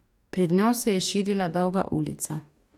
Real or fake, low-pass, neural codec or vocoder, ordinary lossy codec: fake; 19.8 kHz; codec, 44.1 kHz, 2.6 kbps, DAC; none